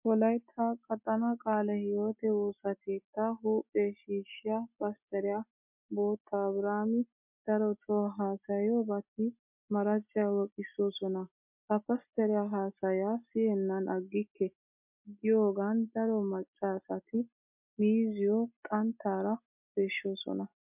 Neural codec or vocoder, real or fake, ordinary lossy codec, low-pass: none; real; MP3, 32 kbps; 3.6 kHz